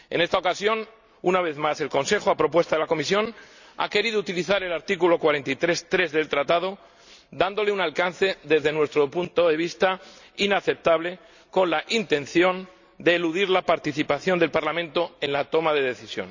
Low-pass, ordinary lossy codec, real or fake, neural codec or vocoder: 7.2 kHz; none; real; none